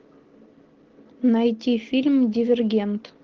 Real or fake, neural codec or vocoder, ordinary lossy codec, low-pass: real; none; Opus, 16 kbps; 7.2 kHz